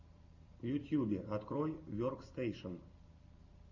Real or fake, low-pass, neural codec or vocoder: real; 7.2 kHz; none